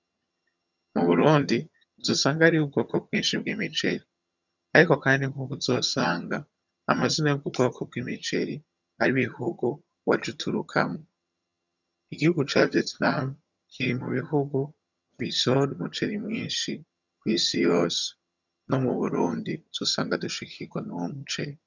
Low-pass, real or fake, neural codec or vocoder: 7.2 kHz; fake; vocoder, 22.05 kHz, 80 mel bands, HiFi-GAN